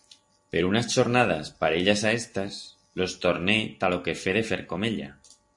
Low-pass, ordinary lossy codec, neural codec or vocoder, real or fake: 10.8 kHz; MP3, 48 kbps; none; real